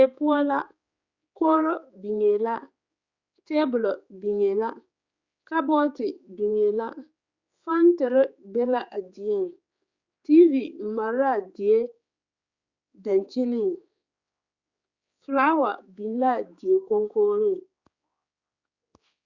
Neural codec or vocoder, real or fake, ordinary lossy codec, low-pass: codec, 16 kHz, 4 kbps, X-Codec, HuBERT features, trained on general audio; fake; Opus, 64 kbps; 7.2 kHz